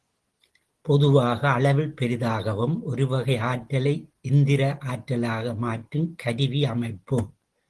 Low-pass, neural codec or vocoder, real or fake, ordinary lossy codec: 10.8 kHz; none; real; Opus, 16 kbps